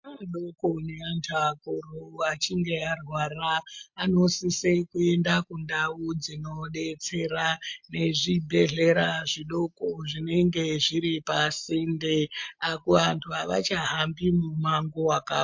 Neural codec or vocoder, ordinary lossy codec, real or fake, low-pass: none; MP3, 48 kbps; real; 7.2 kHz